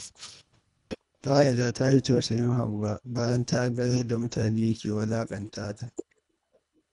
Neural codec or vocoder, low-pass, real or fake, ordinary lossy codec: codec, 24 kHz, 1.5 kbps, HILCodec; 10.8 kHz; fake; none